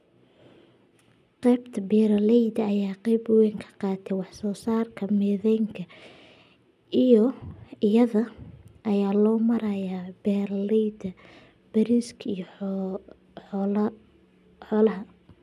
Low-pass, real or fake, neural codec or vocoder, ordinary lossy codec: 14.4 kHz; real; none; none